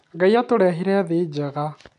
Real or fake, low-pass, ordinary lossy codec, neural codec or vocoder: real; 10.8 kHz; AAC, 96 kbps; none